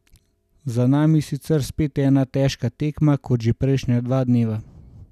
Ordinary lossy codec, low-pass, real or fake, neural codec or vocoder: none; 14.4 kHz; real; none